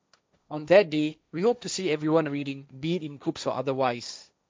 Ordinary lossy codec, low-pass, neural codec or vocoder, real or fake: none; none; codec, 16 kHz, 1.1 kbps, Voila-Tokenizer; fake